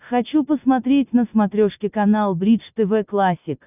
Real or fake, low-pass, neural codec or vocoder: real; 3.6 kHz; none